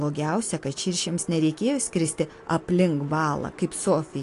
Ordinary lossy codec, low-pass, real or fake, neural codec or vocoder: AAC, 48 kbps; 10.8 kHz; real; none